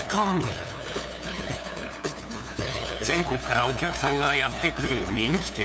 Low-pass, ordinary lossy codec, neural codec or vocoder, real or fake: none; none; codec, 16 kHz, 2 kbps, FunCodec, trained on LibriTTS, 25 frames a second; fake